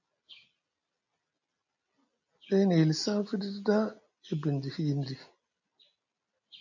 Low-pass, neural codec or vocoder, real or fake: 7.2 kHz; none; real